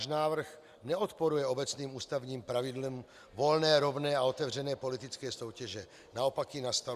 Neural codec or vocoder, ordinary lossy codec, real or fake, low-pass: none; Opus, 64 kbps; real; 14.4 kHz